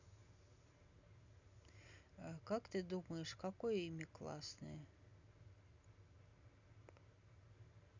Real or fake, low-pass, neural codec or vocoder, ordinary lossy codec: real; 7.2 kHz; none; none